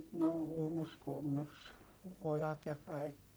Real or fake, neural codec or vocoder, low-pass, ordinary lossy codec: fake; codec, 44.1 kHz, 1.7 kbps, Pupu-Codec; none; none